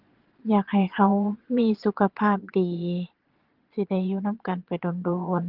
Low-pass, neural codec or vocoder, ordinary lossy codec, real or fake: 5.4 kHz; vocoder, 22.05 kHz, 80 mel bands, Vocos; Opus, 16 kbps; fake